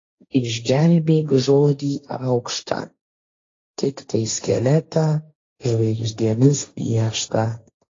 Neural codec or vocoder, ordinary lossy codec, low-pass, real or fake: codec, 16 kHz, 1.1 kbps, Voila-Tokenizer; AAC, 32 kbps; 7.2 kHz; fake